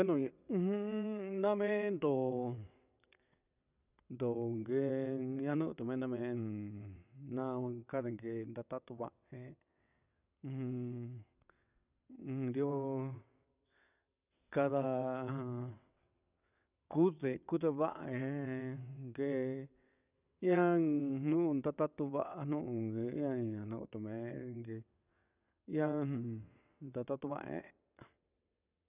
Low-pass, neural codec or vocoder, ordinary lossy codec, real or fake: 3.6 kHz; vocoder, 22.05 kHz, 80 mel bands, WaveNeXt; none; fake